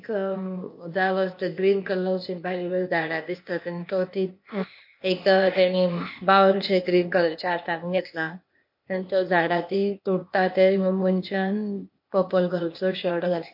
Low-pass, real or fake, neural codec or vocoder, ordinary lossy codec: 5.4 kHz; fake; codec, 16 kHz, 0.8 kbps, ZipCodec; MP3, 32 kbps